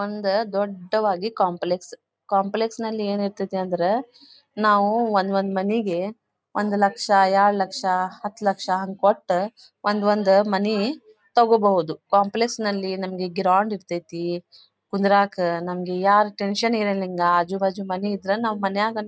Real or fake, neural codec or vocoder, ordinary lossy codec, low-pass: real; none; none; none